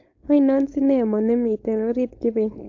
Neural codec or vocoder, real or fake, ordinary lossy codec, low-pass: codec, 16 kHz, 4.8 kbps, FACodec; fake; AAC, 48 kbps; 7.2 kHz